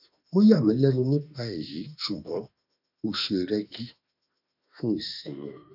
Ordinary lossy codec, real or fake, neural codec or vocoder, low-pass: none; fake; autoencoder, 48 kHz, 32 numbers a frame, DAC-VAE, trained on Japanese speech; 5.4 kHz